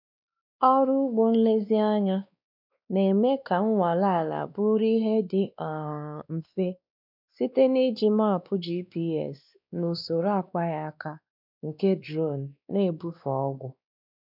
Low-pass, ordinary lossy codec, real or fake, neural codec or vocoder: 5.4 kHz; none; fake; codec, 16 kHz, 2 kbps, X-Codec, WavLM features, trained on Multilingual LibriSpeech